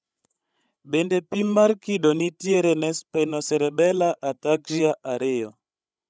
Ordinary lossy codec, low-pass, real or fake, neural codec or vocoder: none; none; fake; codec, 16 kHz, 16 kbps, FreqCodec, larger model